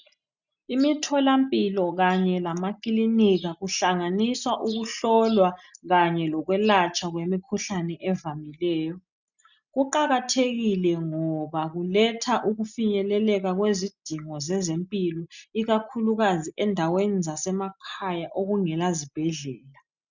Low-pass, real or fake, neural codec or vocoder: 7.2 kHz; real; none